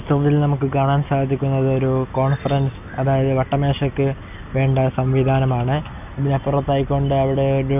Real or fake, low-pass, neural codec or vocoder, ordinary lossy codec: real; 3.6 kHz; none; none